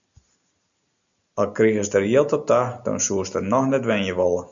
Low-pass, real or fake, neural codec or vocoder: 7.2 kHz; real; none